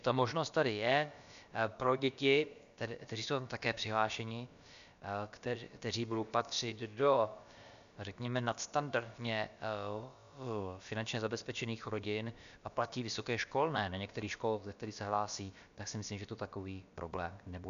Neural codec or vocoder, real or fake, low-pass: codec, 16 kHz, about 1 kbps, DyCAST, with the encoder's durations; fake; 7.2 kHz